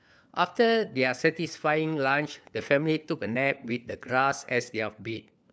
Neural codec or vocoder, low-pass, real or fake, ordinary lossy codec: codec, 16 kHz, 4 kbps, FunCodec, trained on LibriTTS, 50 frames a second; none; fake; none